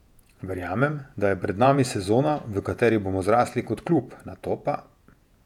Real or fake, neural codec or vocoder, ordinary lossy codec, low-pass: fake; vocoder, 48 kHz, 128 mel bands, Vocos; none; 19.8 kHz